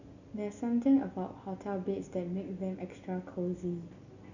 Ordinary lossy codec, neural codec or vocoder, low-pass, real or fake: none; none; 7.2 kHz; real